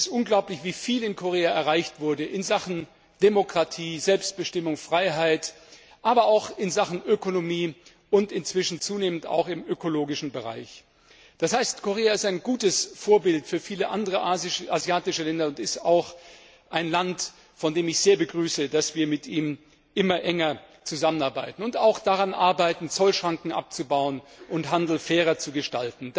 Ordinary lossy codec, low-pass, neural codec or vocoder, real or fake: none; none; none; real